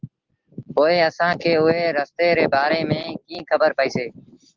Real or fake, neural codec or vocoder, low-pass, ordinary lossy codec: real; none; 7.2 kHz; Opus, 16 kbps